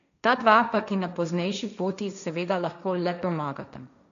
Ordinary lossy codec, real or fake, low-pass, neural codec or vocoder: none; fake; 7.2 kHz; codec, 16 kHz, 1.1 kbps, Voila-Tokenizer